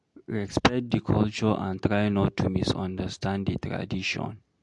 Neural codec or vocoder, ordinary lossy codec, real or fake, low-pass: none; MP3, 64 kbps; real; 10.8 kHz